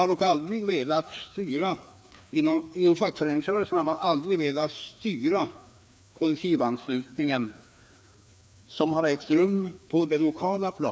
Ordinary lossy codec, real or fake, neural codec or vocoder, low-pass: none; fake; codec, 16 kHz, 2 kbps, FreqCodec, larger model; none